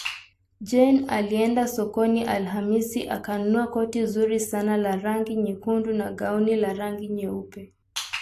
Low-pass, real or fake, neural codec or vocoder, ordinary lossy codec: 14.4 kHz; real; none; AAC, 64 kbps